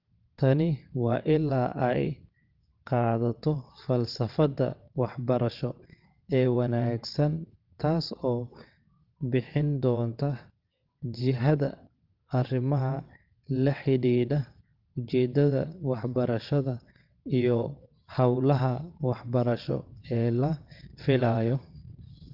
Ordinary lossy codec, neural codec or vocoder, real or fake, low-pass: Opus, 32 kbps; vocoder, 22.05 kHz, 80 mel bands, WaveNeXt; fake; 5.4 kHz